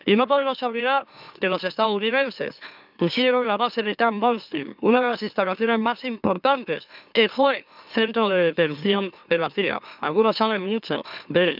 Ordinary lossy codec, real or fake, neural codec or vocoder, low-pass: none; fake; autoencoder, 44.1 kHz, a latent of 192 numbers a frame, MeloTTS; 5.4 kHz